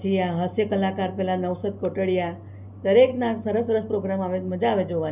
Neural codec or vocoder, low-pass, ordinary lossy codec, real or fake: none; 3.6 kHz; none; real